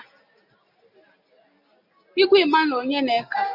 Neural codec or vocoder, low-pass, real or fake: none; 5.4 kHz; real